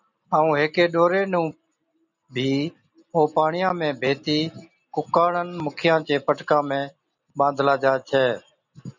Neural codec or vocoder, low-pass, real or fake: none; 7.2 kHz; real